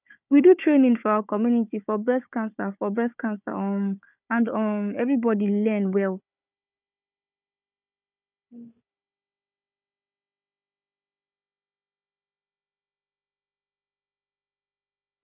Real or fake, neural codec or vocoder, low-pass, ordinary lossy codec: fake; codec, 16 kHz, 4 kbps, FunCodec, trained on Chinese and English, 50 frames a second; 3.6 kHz; none